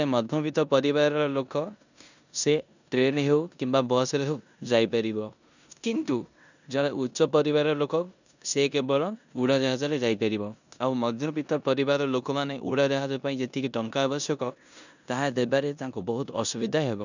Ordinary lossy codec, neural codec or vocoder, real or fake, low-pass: none; codec, 16 kHz in and 24 kHz out, 0.9 kbps, LongCat-Audio-Codec, four codebook decoder; fake; 7.2 kHz